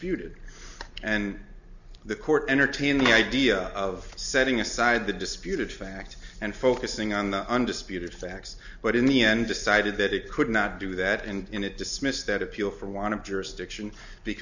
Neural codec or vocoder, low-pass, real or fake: none; 7.2 kHz; real